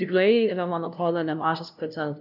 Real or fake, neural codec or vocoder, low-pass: fake; codec, 16 kHz, 0.5 kbps, FunCodec, trained on LibriTTS, 25 frames a second; 5.4 kHz